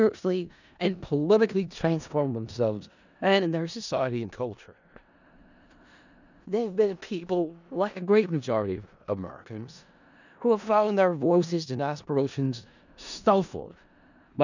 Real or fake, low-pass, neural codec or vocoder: fake; 7.2 kHz; codec, 16 kHz in and 24 kHz out, 0.4 kbps, LongCat-Audio-Codec, four codebook decoder